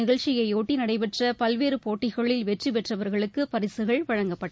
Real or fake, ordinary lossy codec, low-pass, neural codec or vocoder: real; none; none; none